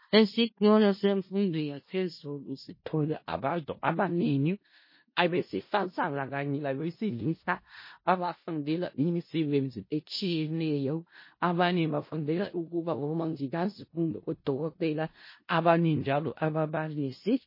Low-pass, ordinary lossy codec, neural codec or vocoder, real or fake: 5.4 kHz; MP3, 24 kbps; codec, 16 kHz in and 24 kHz out, 0.4 kbps, LongCat-Audio-Codec, four codebook decoder; fake